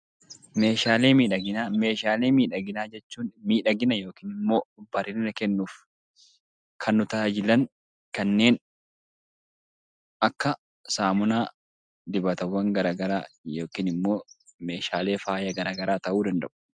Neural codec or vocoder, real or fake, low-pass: none; real; 9.9 kHz